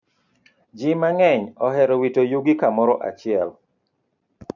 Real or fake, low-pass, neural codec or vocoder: real; 7.2 kHz; none